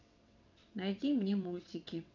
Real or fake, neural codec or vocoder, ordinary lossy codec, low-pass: fake; vocoder, 22.05 kHz, 80 mel bands, WaveNeXt; none; 7.2 kHz